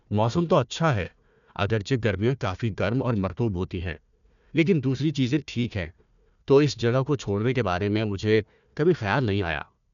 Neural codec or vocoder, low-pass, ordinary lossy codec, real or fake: codec, 16 kHz, 1 kbps, FunCodec, trained on Chinese and English, 50 frames a second; 7.2 kHz; none; fake